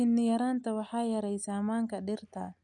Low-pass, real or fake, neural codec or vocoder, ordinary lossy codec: 10.8 kHz; real; none; none